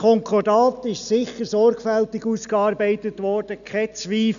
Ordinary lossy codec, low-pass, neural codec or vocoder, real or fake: none; 7.2 kHz; none; real